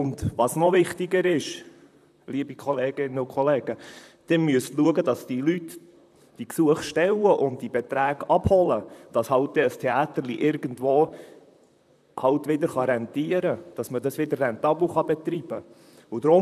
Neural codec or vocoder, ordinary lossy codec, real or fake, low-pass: vocoder, 44.1 kHz, 128 mel bands, Pupu-Vocoder; none; fake; 14.4 kHz